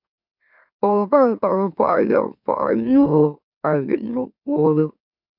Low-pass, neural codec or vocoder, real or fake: 5.4 kHz; autoencoder, 44.1 kHz, a latent of 192 numbers a frame, MeloTTS; fake